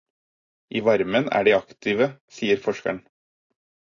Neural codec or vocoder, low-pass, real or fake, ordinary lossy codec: none; 7.2 kHz; real; AAC, 32 kbps